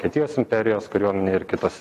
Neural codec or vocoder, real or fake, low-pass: none; real; 14.4 kHz